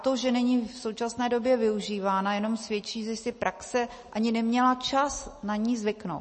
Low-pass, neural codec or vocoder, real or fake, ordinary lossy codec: 10.8 kHz; none; real; MP3, 32 kbps